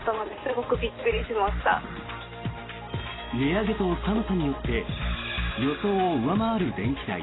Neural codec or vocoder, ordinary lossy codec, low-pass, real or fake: none; AAC, 16 kbps; 7.2 kHz; real